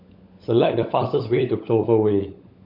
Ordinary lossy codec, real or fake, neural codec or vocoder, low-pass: none; fake; codec, 16 kHz, 16 kbps, FunCodec, trained on LibriTTS, 50 frames a second; 5.4 kHz